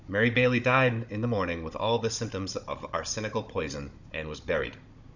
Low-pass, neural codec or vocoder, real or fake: 7.2 kHz; codec, 16 kHz, 16 kbps, FunCodec, trained on Chinese and English, 50 frames a second; fake